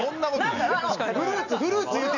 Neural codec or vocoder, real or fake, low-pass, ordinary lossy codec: none; real; 7.2 kHz; none